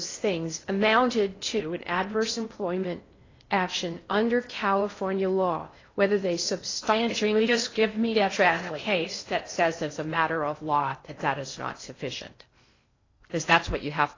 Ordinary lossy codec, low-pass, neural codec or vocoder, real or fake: AAC, 32 kbps; 7.2 kHz; codec, 16 kHz in and 24 kHz out, 0.6 kbps, FocalCodec, streaming, 4096 codes; fake